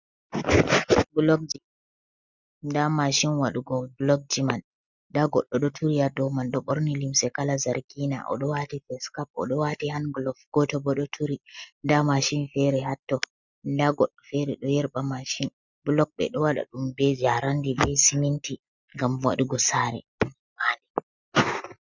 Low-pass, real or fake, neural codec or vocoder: 7.2 kHz; real; none